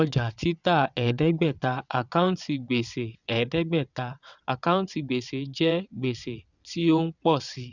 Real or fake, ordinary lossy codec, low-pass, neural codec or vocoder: fake; none; 7.2 kHz; vocoder, 22.05 kHz, 80 mel bands, WaveNeXt